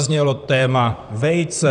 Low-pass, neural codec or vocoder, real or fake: 10.8 kHz; vocoder, 44.1 kHz, 128 mel bands, Pupu-Vocoder; fake